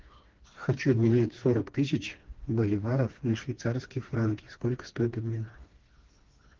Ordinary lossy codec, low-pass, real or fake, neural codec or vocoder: Opus, 16 kbps; 7.2 kHz; fake; codec, 16 kHz, 2 kbps, FreqCodec, smaller model